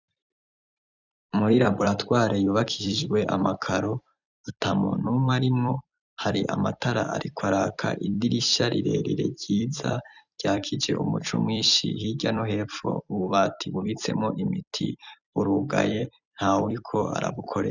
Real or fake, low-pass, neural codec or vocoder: fake; 7.2 kHz; vocoder, 44.1 kHz, 128 mel bands every 256 samples, BigVGAN v2